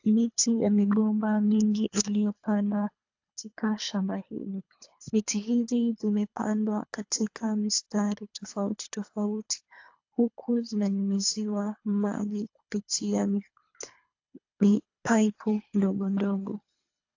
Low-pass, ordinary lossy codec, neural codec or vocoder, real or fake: 7.2 kHz; AAC, 48 kbps; codec, 24 kHz, 3 kbps, HILCodec; fake